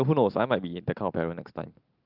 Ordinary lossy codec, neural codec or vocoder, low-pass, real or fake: Opus, 32 kbps; none; 5.4 kHz; real